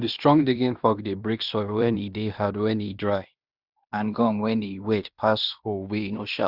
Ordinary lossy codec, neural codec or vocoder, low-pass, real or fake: Opus, 64 kbps; codec, 16 kHz in and 24 kHz out, 0.9 kbps, LongCat-Audio-Codec, fine tuned four codebook decoder; 5.4 kHz; fake